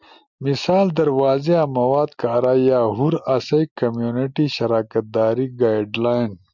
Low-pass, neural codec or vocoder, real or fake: 7.2 kHz; none; real